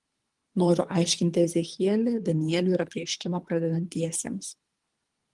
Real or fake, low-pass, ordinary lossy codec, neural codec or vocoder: fake; 10.8 kHz; Opus, 24 kbps; codec, 24 kHz, 3 kbps, HILCodec